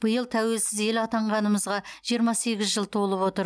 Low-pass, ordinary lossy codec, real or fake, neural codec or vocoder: none; none; real; none